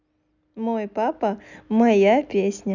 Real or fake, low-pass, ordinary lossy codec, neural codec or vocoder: real; 7.2 kHz; none; none